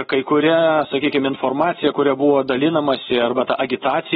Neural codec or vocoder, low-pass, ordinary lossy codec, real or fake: none; 19.8 kHz; AAC, 16 kbps; real